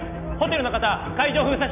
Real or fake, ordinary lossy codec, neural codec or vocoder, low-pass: real; none; none; 3.6 kHz